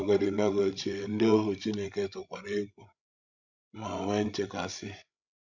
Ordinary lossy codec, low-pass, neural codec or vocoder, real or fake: none; 7.2 kHz; codec, 16 kHz, 16 kbps, FreqCodec, larger model; fake